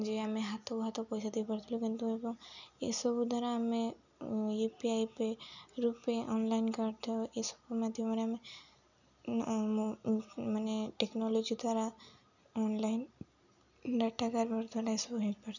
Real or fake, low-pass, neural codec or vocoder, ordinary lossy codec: real; 7.2 kHz; none; none